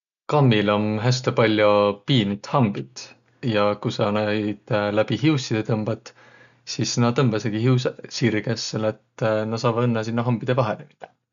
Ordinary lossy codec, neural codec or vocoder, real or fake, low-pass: none; none; real; 7.2 kHz